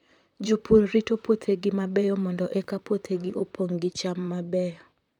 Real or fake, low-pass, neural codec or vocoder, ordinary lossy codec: fake; 19.8 kHz; vocoder, 44.1 kHz, 128 mel bands, Pupu-Vocoder; none